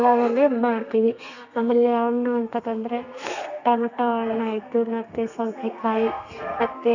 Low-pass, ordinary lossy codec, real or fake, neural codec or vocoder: 7.2 kHz; none; fake; codec, 32 kHz, 1.9 kbps, SNAC